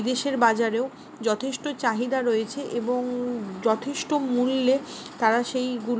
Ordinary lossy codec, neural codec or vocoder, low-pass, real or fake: none; none; none; real